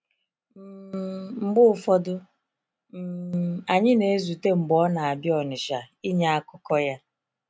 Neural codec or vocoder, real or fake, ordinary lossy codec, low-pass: none; real; none; none